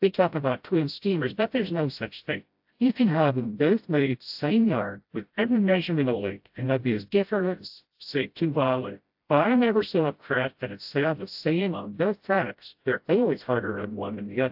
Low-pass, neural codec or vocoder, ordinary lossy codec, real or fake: 5.4 kHz; codec, 16 kHz, 0.5 kbps, FreqCodec, smaller model; AAC, 48 kbps; fake